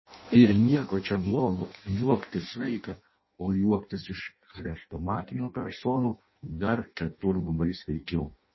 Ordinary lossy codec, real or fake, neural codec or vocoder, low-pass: MP3, 24 kbps; fake; codec, 16 kHz in and 24 kHz out, 0.6 kbps, FireRedTTS-2 codec; 7.2 kHz